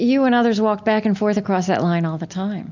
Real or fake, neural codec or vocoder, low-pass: real; none; 7.2 kHz